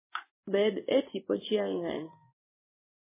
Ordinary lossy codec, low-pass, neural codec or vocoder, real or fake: MP3, 16 kbps; 3.6 kHz; codec, 16 kHz in and 24 kHz out, 1 kbps, XY-Tokenizer; fake